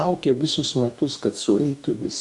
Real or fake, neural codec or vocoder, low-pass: fake; codec, 44.1 kHz, 2.6 kbps, DAC; 10.8 kHz